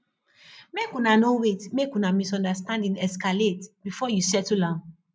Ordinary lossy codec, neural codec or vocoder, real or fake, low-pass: none; none; real; none